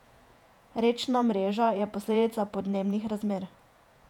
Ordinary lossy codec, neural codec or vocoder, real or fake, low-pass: none; vocoder, 48 kHz, 128 mel bands, Vocos; fake; 19.8 kHz